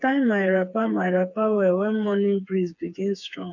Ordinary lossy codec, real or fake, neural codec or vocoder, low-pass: none; fake; codec, 16 kHz, 4 kbps, FreqCodec, smaller model; 7.2 kHz